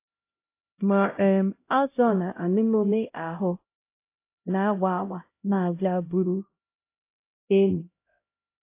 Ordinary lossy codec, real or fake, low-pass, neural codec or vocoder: AAC, 24 kbps; fake; 3.6 kHz; codec, 16 kHz, 0.5 kbps, X-Codec, HuBERT features, trained on LibriSpeech